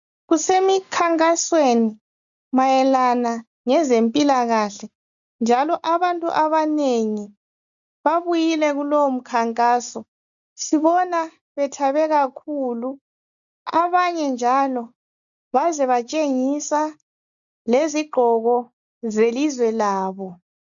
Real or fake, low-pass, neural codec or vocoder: real; 7.2 kHz; none